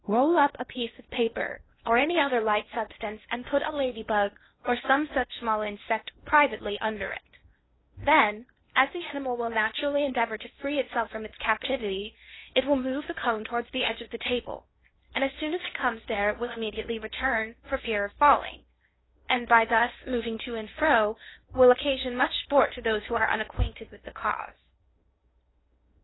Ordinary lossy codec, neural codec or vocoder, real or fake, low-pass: AAC, 16 kbps; codec, 16 kHz in and 24 kHz out, 0.8 kbps, FocalCodec, streaming, 65536 codes; fake; 7.2 kHz